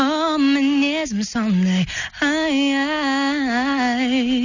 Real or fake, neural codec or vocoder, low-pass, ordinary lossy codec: real; none; 7.2 kHz; none